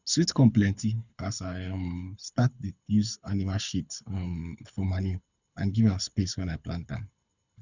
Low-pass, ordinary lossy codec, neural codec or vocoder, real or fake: 7.2 kHz; none; codec, 24 kHz, 6 kbps, HILCodec; fake